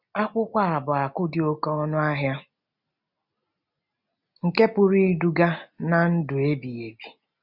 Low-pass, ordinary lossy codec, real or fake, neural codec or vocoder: 5.4 kHz; none; real; none